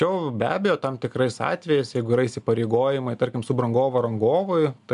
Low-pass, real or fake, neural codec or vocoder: 10.8 kHz; real; none